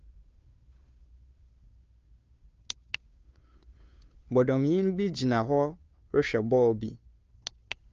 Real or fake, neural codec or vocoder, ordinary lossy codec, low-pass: fake; codec, 16 kHz, 2 kbps, FunCodec, trained on Chinese and English, 25 frames a second; Opus, 24 kbps; 7.2 kHz